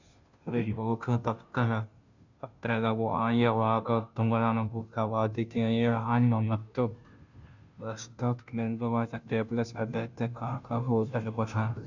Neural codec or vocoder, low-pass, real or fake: codec, 16 kHz, 0.5 kbps, FunCodec, trained on Chinese and English, 25 frames a second; 7.2 kHz; fake